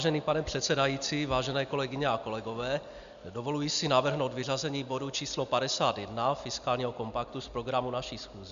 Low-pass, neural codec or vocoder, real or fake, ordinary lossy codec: 7.2 kHz; none; real; AAC, 96 kbps